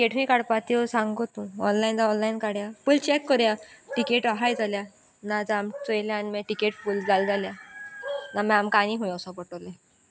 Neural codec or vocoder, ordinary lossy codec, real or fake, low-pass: none; none; real; none